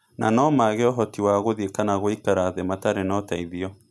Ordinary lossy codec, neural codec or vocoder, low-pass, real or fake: none; none; none; real